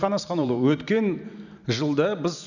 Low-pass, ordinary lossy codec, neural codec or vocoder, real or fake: 7.2 kHz; none; vocoder, 44.1 kHz, 128 mel bands every 512 samples, BigVGAN v2; fake